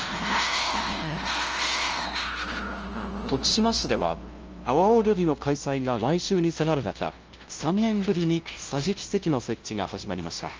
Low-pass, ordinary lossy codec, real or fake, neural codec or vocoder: 7.2 kHz; Opus, 24 kbps; fake; codec, 16 kHz, 0.5 kbps, FunCodec, trained on LibriTTS, 25 frames a second